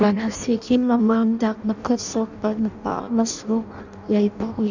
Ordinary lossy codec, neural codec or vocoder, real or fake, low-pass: none; codec, 16 kHz in and 24 kHz out, 0.6 kbps, FireRedTTS-2 codec; fake; 7.2 kHz